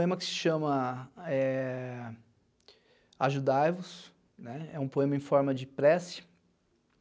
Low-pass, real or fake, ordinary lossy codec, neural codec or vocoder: none; real; none; none